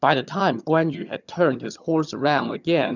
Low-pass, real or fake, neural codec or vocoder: 7.2 kHz; fake; vocoder, 22.05 kHz, 80 mel bands, HiFi-GAN